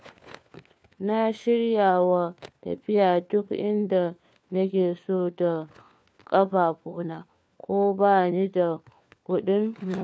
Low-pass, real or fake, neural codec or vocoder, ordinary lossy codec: none; fake; codec, 16 kHz, 4 kbps, FunCodec, trained on LibriTTS, 50 frames a second; none